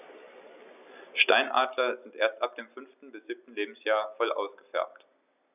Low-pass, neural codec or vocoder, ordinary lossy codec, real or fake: 3.6 kHz; none; none; real